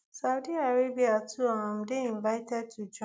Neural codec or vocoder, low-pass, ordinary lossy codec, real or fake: none; none; none; real